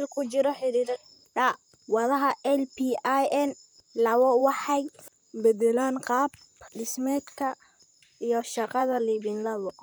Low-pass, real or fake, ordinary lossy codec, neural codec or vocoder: none; fake; none; vocoder, 44.1 kHz, 128 mel bands, Pupu-Vocoder